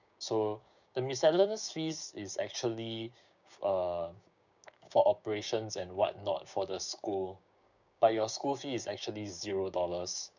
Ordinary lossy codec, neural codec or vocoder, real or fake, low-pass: none; codec, 16 kHz, 6 kbps, DAC; fake; 7.2 kHz